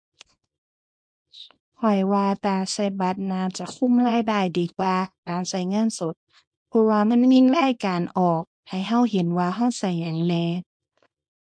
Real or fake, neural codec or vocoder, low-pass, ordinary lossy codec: fake; codec, 24 kHz, 0.9 kbps, WavTokenizer, small release; 9.9 kHz; MP3, 64 kbps